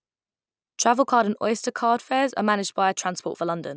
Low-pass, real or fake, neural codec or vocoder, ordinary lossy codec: none; real; none; none